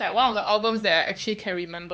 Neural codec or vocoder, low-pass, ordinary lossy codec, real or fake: codec, 16 kHz, 4 kbps, X-Codec, HuBERT features, trained on LibriSpeech; none; none; fake